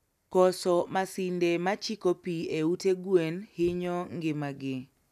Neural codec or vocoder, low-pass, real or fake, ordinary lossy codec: none; 14.4 kHz; real; none